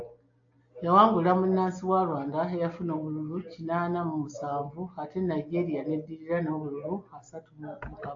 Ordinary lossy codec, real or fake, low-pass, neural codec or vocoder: AAC, 64 kbps; real; 7.2 kHz; none